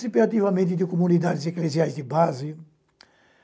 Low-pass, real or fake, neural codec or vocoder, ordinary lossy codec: none; real; none; none